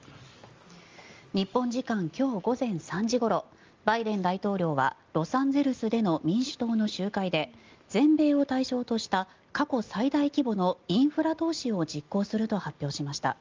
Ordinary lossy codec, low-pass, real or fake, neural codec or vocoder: Opus, 32 kbps; 7.2 kHz; real; none